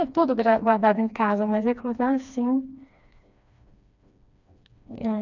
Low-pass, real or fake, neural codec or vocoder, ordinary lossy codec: 7.2 kHz; fake; codec, 16 kHz, 2 kbps, FreqCodec, smaller model; none